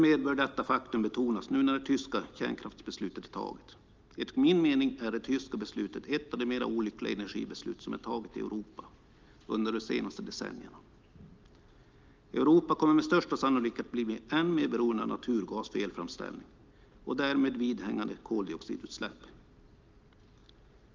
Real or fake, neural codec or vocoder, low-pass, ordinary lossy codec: real; none; 7.2 kHz; Opus, 24 kbps